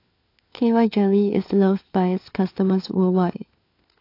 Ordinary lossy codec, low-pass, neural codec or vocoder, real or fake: AAC, 32 kbps; 5.4 kHz; codec, 16 kHz, 4 kbps, FunCodec, trained on LibriTTS, 50 frames a second; fake